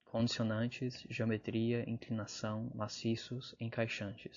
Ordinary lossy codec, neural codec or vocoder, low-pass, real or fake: AAC, 64 kbps; none; 7.2 kHz; real